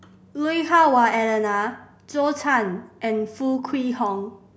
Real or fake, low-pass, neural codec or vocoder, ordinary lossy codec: real; none; none; none